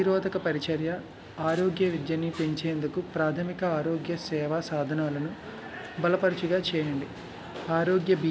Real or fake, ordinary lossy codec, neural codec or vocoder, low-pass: real; none; none; none